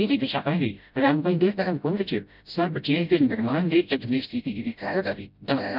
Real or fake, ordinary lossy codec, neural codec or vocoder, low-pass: fake; none; codec, 16 kHz, 0.5 kbps, FreqCodec, smaller model; 5.4 kHz